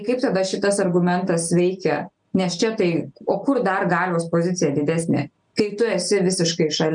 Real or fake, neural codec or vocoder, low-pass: real; none; 9.9 kHz